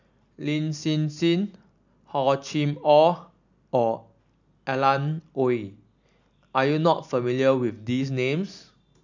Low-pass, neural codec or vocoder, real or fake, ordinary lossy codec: 7.2 kHz; none; real; none